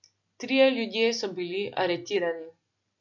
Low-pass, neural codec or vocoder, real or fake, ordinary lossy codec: 7.2 kHz; none; real; none